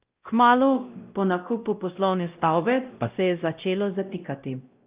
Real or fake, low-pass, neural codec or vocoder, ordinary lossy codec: fake; 3.6 kHz; codec, 16 kHz, 0.5 kbps, X-Codec, WavLM features, trained on Multilingual LibriSpeech; Opus, 24 kbps